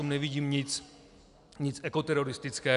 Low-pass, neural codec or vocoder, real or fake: 10.8 kHz; none; real